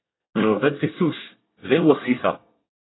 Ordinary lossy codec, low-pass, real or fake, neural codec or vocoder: AAC, 16 kbps; 7.2 kHz; fake; codec, 24 kHz, 1 kbps, SNAC